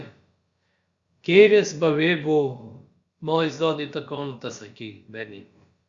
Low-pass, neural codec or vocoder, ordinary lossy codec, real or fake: 7.2 kHz; codec, 16 kHz, about 1 kbps, DyCAST, with the encoder's durations; Opus, 64 kbps; fake